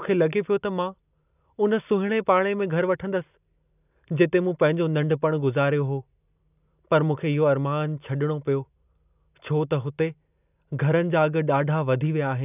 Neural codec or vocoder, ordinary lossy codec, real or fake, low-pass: none; none; real; 3.6 kHz